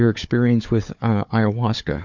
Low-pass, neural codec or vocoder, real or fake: 7.2 kHz; codec, 16 kHz, 4 kbps, FunCodec, trained on Chinese and English, 50 frames a second; fake